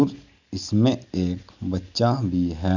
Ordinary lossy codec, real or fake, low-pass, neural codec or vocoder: none; real; 7.2 kHz; none